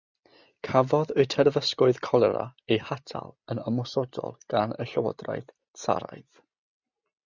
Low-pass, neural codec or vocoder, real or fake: 7.2 kHz; none; real